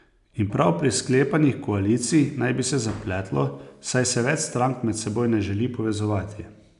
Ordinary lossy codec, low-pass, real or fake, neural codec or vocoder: none; 10.8 kHz; real; none